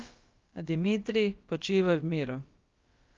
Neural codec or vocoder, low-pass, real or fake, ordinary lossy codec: codec, 16 kHz, about 1 kbps, DyCAST, with the encoder's durations; 7.2 kHz; fake; Opus, 32 kbps